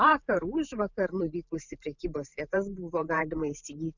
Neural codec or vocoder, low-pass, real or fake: vocoder, 44.1 kHz, 128 mel bands every 512 samples, BigVGAN v2; 7.2 kHz; fake